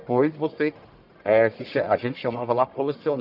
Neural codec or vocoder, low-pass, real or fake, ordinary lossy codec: codec, 44.1 kHz, 1.7 kbps, Pupu-Codec; 5.4 kHz; fake; none